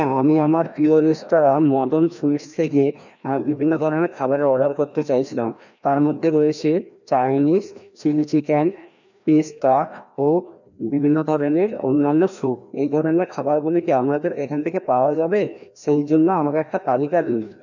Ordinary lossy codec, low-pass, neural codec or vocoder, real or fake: none; 7.2 kHz; codec, 16 kHz, 1 kbps, FreqCodec, larger model; fake